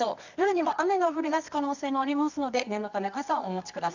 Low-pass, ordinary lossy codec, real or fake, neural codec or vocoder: 7.2 kHz; none; fake; codec, 24 kHz, 0.9 kbps, WavTokenizer, medium music audio release